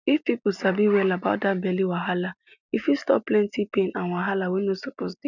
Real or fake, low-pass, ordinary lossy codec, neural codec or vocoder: real; 7.2 kHz; none; none